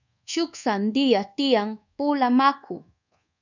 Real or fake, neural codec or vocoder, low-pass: fake; codec, 24 kHz, 1.2 kbps, DualCodec; 7.2 kHz